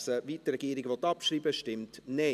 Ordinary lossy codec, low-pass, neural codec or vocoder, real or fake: none; 14.4 kHz; none; real